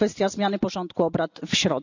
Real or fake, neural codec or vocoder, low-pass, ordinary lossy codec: real; none; 7.2 kHz; none